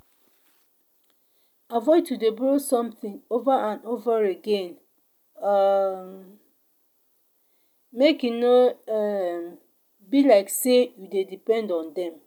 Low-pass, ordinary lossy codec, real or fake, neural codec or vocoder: 19.8 kHz; none; real; none